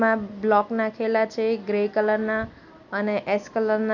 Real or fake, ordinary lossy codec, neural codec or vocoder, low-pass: real; none; none; 7.2 kHz